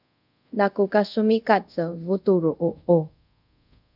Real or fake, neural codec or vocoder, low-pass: fake; codec, 24 kHz, 0.5 kbps, DualCodec; 5.4 kHz